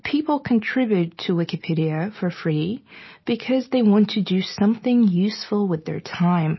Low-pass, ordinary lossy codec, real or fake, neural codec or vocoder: 7.2 kHz; MP3, 24 kbps; real; none